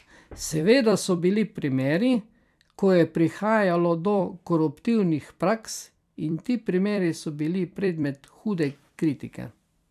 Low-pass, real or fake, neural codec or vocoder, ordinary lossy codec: 14.4 kHz; fake; vocoder, 44.1 kHz, 128 mel bands every 256 samples, BigVGAN v2; none